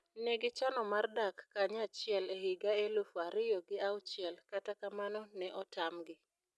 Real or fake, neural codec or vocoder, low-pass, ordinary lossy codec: real; none; none; none